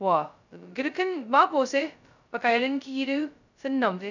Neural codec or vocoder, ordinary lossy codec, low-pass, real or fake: codec, 16 kHz, 0.2 kbps, FocalCodec; none; 7.2 kHz; fake